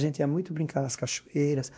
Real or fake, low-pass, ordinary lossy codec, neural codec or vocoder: fake; none; none; codec, 16 kHz, 1 kbps, X-Codec, WavLM features, trained on Multilingual LibriSpeech